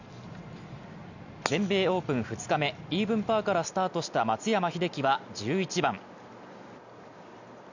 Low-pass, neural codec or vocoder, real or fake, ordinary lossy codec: 7.2 kHz; none; real; none